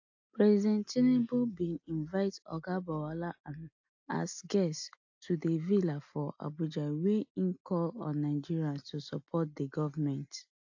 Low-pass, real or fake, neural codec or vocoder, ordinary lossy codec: 7.2 kHz; real; none; AAC, 48 kbps